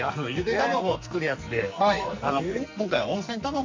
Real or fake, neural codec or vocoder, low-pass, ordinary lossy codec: fake; codec, 44.1 kHz, 2.6 kbps, SNAC; 7.2 kHz; MP3, 48 kbps